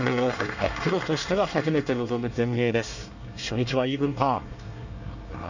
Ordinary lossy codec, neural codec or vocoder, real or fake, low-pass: none; codec, 24 kHz, 1 kbps, SNAC; fake; 7.2 kHz